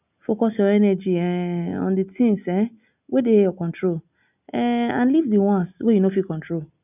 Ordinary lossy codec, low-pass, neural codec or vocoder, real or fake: none; 3.6 kHz; none; real